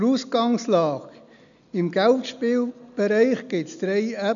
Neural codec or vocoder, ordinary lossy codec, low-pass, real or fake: none; none; 7.2 kHz; real